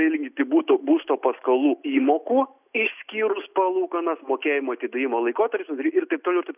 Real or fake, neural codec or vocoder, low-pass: real; none; 3.6 kHz